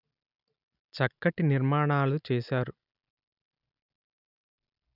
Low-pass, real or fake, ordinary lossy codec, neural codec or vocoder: 5.4 kHz; real; none; none